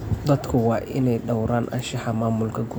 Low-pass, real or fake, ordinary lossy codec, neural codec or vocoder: none; real; none; none